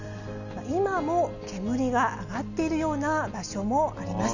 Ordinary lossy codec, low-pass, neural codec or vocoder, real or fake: none; 7.2 kHz; none; real